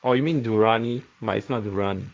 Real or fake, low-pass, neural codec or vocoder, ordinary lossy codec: fake; none; codec, 16 kHz, 1.1 kbps, Voila-Tokenizer; none